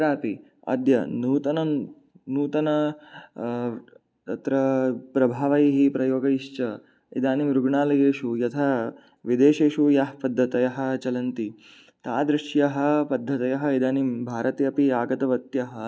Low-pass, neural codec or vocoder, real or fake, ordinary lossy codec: none; none; real; none